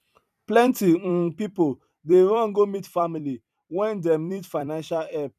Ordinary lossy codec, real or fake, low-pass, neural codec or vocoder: none; fake; 14.4 kHz; vocoder, 44.1 kHz, 128 mel bands every 256 samples, BigVGAN v2